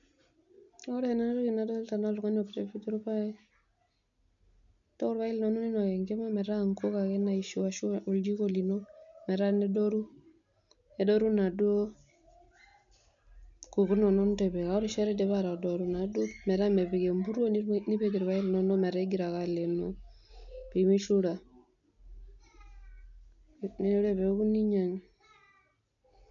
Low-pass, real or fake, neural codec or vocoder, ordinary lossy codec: 7.2 kHz; real; none; none